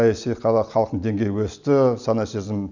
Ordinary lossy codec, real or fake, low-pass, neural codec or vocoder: none; real; 7.2 kHz; none